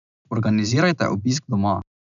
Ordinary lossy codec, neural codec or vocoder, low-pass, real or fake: none; none; 7.2 kHz; real